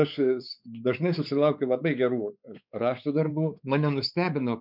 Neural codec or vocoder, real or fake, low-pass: codec, 16 kHz, 4 kbps, X-Codec, WavLM features, trained on Multilingual LibriSpeech; fake; 5.4 kHz